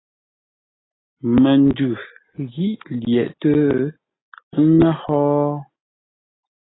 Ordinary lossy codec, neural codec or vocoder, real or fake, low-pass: AAC, 16 kbps; none; real; 7.2 kHz